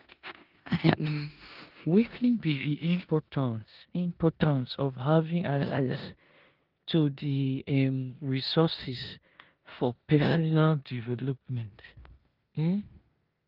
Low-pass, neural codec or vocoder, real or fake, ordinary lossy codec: 5.4 kHz; codec, 16 kHz in and 24 kHz out, 0.9 kbps, LongCat-Audio-Codec, four codebook decoder; fake; Opus, 32 kbps